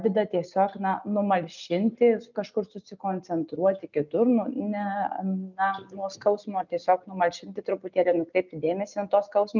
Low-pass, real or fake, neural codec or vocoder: 7.2 kHz; real; none